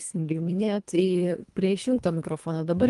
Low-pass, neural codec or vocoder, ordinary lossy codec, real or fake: 10.8 kHz; codec, 24 kHz, 1.5 kbps, HILCodec; Opus, 32 kbps; fake